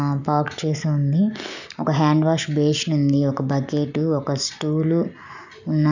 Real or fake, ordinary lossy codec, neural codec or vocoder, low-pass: real; none; none; 7.2 kHz